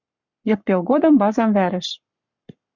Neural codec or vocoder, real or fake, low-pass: codec, 44.1 kHz, 7.8 kbps, Pupu-Codec; fake; 7.2 kHz